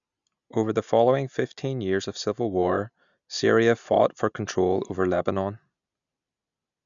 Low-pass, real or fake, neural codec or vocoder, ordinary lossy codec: 7.2 kHz; real; none; none